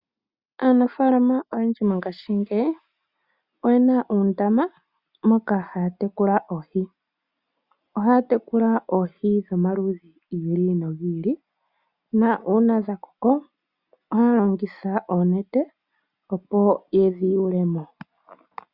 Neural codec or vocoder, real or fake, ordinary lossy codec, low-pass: none; real; Opus, 64 kbps; 5.4 kHz